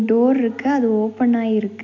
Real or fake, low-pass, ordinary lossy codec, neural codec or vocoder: real; 7.2 kHz; AAC, 48 kbps; none